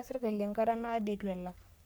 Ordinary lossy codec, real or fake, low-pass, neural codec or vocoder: none; fake; none; codec, 44.1 kHz, 3.4 kbps, Pupu-Codec